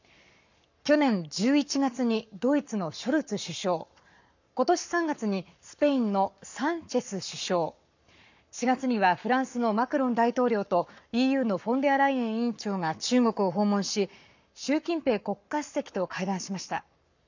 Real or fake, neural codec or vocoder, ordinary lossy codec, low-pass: fake; codec, 44.1 kHz, 7.8 kbps, Pupu-Codec; none; 7.2 kHz